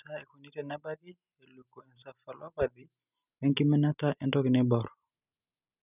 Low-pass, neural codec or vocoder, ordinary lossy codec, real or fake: 3.6 kHz; none; none; real